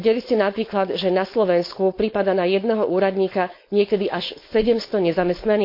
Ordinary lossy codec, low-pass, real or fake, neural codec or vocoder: MP3, 32 kbps; 5.4 kHz; fake; codec, 16 kHz, 4.8 kbps, FACodec